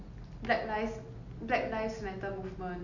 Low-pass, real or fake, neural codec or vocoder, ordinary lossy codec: 7.2 kHz; real; none; none